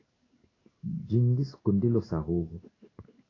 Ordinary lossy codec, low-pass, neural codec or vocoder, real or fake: AAC, 32 kbps; 7.2 kHz; codec, 16 kHz in and 24 kHz out, 1 kbps, XY-Tokenizer; fake